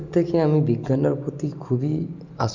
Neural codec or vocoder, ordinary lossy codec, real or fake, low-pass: none; none; real; 7.2 kHz